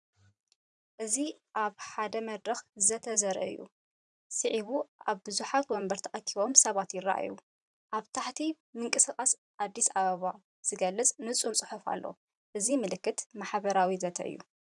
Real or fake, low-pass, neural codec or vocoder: fake; 10.8 kHz; vocoder, 44.1 kHz, 128 mel bands every 512 samples, BigVGAN v2